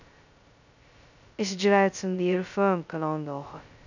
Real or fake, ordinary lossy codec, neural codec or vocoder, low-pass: fake; none; codec, 16 kHz, 0.2 kbps, FocalCodec; 7.2 kHz